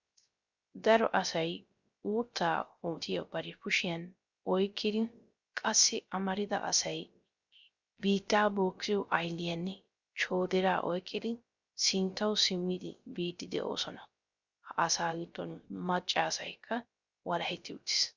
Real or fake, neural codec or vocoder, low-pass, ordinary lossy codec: fake; codec, 16 kHz, 0.3 kbps, FocalCodec; 7.2 kHz; Opus, 64 kbps